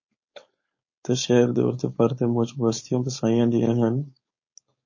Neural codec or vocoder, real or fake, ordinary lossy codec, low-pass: codec, 16 kHz, 4.8 kbps, FACodec; fake; MP3, 32 kbps; 7.2 kHz